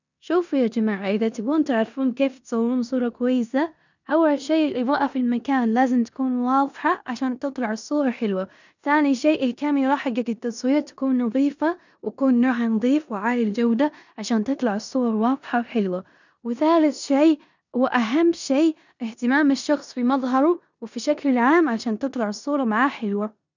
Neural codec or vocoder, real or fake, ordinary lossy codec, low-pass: codec, 16 kHz in and 24 kHz out, 0.9 kbps, LongCat-Audio-Codec, four codebook decoder; fake; none; 7.2 kHz